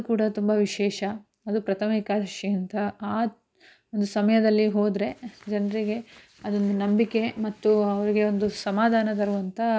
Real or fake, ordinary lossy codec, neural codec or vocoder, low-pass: real; none; none; none